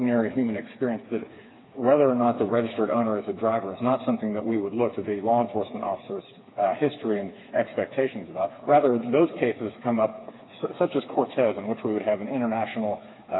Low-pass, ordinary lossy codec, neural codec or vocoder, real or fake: 7.2 kHz; AAC, 16 kbps; codec, 16 kHz, 4 kbps, FreqCodec, smaller model; fake